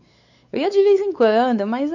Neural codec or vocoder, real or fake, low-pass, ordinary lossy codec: codec, 16 kHz, 4 kbps, X-Codec, WavLM features, trained on Multilingual LibriSpeech; fake; 7.2 kHz; none